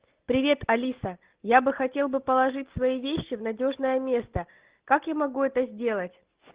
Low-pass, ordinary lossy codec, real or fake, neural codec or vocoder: 3.6 kHz; Opus, 16 kbps; real; none